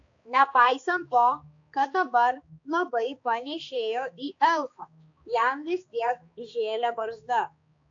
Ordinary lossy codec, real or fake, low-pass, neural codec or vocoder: AAC, 48 kbps; fake; 7.2 kHz; codec, 16 kHz, 2 kbps, X-Codec, HuBERT features, trained on balanced general audio